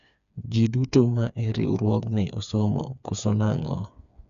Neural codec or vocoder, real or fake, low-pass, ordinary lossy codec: codec, 16 kHz, 4 kbps, FreqCodec, smaller model; fake; 7.2 kHz; none